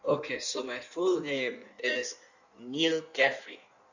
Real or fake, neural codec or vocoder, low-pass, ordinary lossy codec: fake; codec, 16 kHz in and 24 kHz out, 1.1 kbps, FireRedTTS-2 codec; 7.2 kHz; none